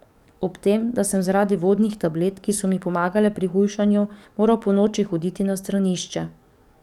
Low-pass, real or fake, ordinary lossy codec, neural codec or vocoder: 19.8 kHz; fake; none; codec, 44.1 kHz, 7.8 kbps, DAC